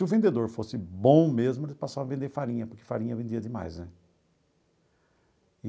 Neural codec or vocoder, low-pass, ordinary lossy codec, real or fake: none; none; none; real